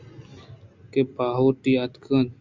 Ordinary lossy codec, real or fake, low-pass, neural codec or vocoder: MP3, 64 kbps; real; 7.2 kHz; none